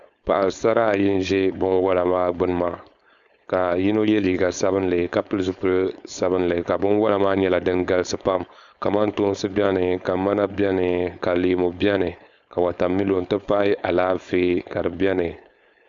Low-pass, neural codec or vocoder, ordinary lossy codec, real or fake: 7.2 kHz; codec, 16 kHz, 4.8 kbps, FACodec; Opus, 64 kbps; fake